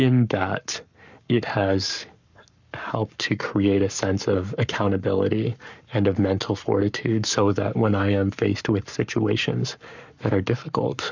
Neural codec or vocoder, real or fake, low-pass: codec, 44.1 kHz, 7.8 kbps, Pupu-Codec; fake; 7.2 kHz